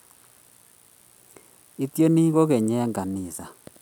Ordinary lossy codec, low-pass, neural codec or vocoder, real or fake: none; 19.8 kHz; none; real